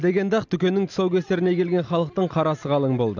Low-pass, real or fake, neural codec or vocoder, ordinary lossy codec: 7.2 kHz; real; none; none